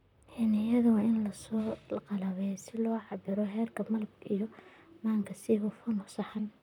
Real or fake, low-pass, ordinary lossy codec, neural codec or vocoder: fake; 19.8 kHz; none; vocoder, 44.1 kHz, 128 mel bands, Pupu-Vocoder